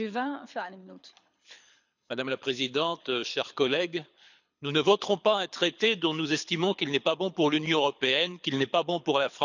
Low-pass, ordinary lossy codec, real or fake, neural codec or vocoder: 7.2 kHz; none; fake; codec, 24 kHz, 6 kbps, HILCodec